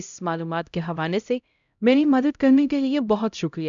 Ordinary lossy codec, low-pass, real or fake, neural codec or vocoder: none; 7.2 kHz; fake; codec, 16 kHz, 0.5 kbps, X-Codec, HuBERT features, trained on LibriSpeech